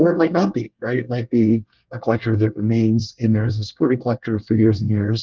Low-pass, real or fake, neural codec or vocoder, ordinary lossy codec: 7.2 kHz; fake; codec, 24 kHz, 1 kbps, SNAC; Opus, 32 kbps